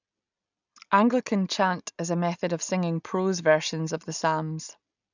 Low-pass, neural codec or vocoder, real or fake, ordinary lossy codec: 7.2 kHz; none; real; none